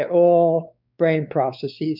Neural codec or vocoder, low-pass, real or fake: codec, 16 kHz, 4 kbps, X-Codec, WavLM features, trained on Multilingual LibriSpeech; 5.4 kHz; fake